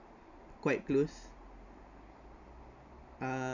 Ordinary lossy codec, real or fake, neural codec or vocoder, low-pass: none; real; none; 7.2 kHz